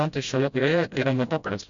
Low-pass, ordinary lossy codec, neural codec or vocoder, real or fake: 7.2 kHz; Opus, 64 kbps; codec, 16 kHz, 0.5 kbps, FreqCodec, smaller model; fake